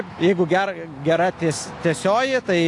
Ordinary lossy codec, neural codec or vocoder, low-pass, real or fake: AAC, 48 kbps; none; 10.8 kHz; real